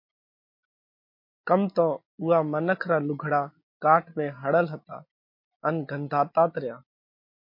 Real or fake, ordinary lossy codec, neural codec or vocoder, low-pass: real; MP3, 32 kbps; none; 5.4 kHz